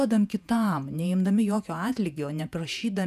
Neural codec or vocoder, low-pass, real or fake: vocoder, 48 kHz, 128 mel bands, Vocos; 14.4 kHz; fake